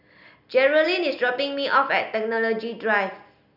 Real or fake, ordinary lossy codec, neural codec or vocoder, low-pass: real; none; none; 5.4 kHz